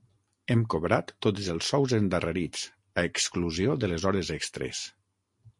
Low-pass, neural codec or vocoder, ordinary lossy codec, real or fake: 10.8 kHz; none; MP3, 48 kbps; real